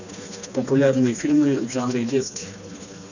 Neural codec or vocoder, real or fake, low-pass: codec, 16 kHz, 2 kbps, FreqCodec, smaller model; fake; 7.2 kHz